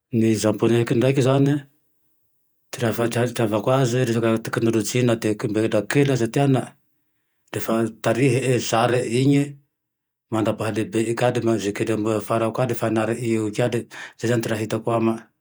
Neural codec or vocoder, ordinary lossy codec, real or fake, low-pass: vocoder, 48 kHz, 128 mel bands, Vocos; none; fake; none